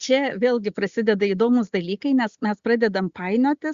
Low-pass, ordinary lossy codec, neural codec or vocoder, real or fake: 7.2 kHz; Opus, 64 kbps; none; real